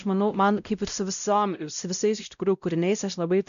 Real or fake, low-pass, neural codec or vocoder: fake; 7.2 kHz; codec, 16 kHz, 0.5 kbps, X-Codec, WavLM features, trained on Multilingual LibriSpeech